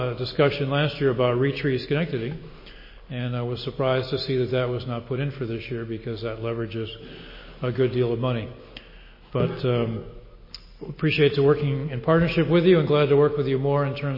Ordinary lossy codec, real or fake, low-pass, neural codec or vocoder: MP3, 24 kbps; real; 5.4 kHz; none